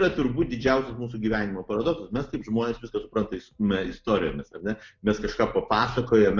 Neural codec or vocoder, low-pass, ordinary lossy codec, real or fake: none; 7.2 kHz; MP3, 64 kbps; real